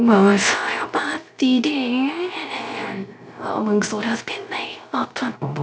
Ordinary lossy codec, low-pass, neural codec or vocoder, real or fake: none; none; codec, 16 kHz, 0.3 kbps, FocalCodec; fake